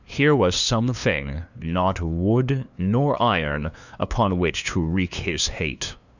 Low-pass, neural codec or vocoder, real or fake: 7.2 kHz; codec, 16 kHz, 2 kbps, FunCodec, trained on LibriTTS, 25 frames a second; fake